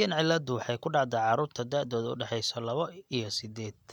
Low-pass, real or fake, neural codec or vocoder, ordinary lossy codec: 19.8 kHz; real; none; none